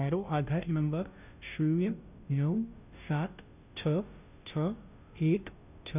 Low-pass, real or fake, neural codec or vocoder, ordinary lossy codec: 3.6 kHz; fake; codec, 16 kHz, 0.5 kbps, FunCodec, trained on Chinese and English, 25 frames a second; none